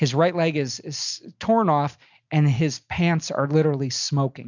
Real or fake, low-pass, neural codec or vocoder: real; 7.2 kHz; none